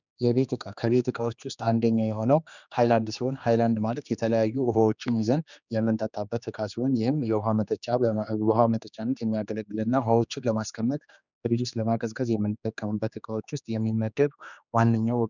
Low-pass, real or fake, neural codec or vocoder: 7.2 kHz; fake; codec, 16 kHz, 2 kbps, X-Codec, HuBERT features, trained on general audio